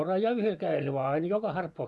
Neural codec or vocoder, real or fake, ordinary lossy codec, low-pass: vocoder, 24 kHz, 100 mel bands, Vocos; fake; none; none